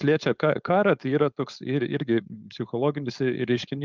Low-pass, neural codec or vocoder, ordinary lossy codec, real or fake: 7.2 kHz; codec, 16 kHz, 4.8 kbps, FACodec; Opus, 24 kbps; fake